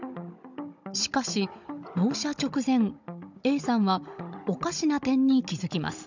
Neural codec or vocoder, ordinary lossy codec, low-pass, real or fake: codec, 16 kHz, 16 kbps, FunCodec, trained on Chinese and English, 50 frames a second; none; 7.2 kHz; fake